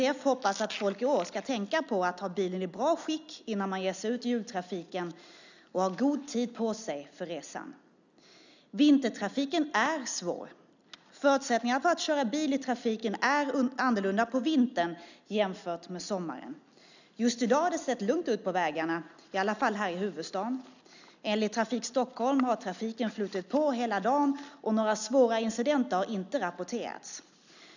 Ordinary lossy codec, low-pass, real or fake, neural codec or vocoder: none; 7.2 kHz; real; none